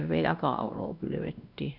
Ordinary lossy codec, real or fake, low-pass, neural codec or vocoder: none; fake; 5.4 kHz; codec, 16 kHz, 1 kbps, X-Codec, WavLM features, trained on Multilingual LibriSpeech